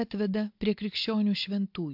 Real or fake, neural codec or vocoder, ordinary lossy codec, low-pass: real; none; MP3, 48 kbps; 5.4 kHz